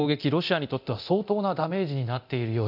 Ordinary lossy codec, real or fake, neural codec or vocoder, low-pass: none; fake; codec, 24 kHz, 0.9 kbps, DualCodec; 5.4 kHz